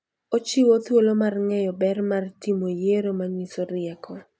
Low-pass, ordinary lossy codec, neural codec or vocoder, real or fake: none; none; none; real